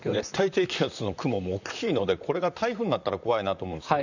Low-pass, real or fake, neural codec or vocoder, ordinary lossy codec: 7.2 kHz; fake; vocoder, 44.1 kHz, 128 mel bands, Pupu-Vocoder; none